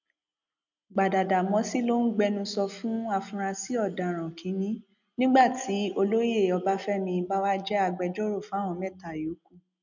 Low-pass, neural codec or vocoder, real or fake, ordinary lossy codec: 7.2 kHz; none; real; none